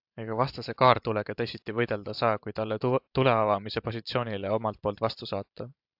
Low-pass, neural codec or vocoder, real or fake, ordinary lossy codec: 5.4 kHz; vocoder, 44.1 kHz, 128 mel bands every 512 samples, BigVGAN v2; fake; AAC, 48 kbps